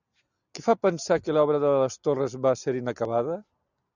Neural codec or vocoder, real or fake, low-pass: none; real; 7.2 kHz